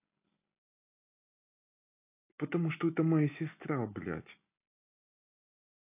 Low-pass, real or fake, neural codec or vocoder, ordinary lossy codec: 3.6 kHz; real; none; AAC, 24 kbps